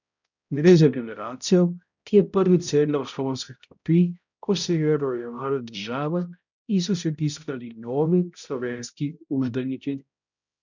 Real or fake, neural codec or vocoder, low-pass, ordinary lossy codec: fake; codec, 16 kHz, 0.5 kbps, X-Codec, HuBERT features, trained on balanced general audio; 7.2 kHz; none